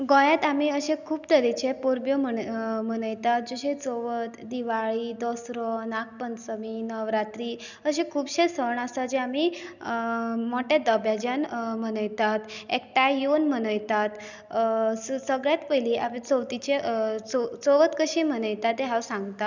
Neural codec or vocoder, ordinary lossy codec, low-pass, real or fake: none; none; 7.2 kHz; real